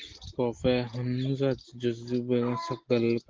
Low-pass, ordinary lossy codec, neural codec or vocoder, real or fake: 7.2 kHz; Opus, 16 kbps; none; real